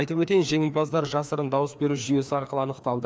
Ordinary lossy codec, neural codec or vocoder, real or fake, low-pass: none; codec, 16 kHz, 2 kbps, FreqCodec, larger model; fake; none